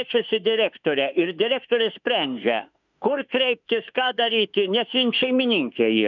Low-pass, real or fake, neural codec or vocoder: 7.2 kHz; fake; autoencoder, 48 kHz, 32 numbers a frame, DAC-VAE, trained on Japanese speech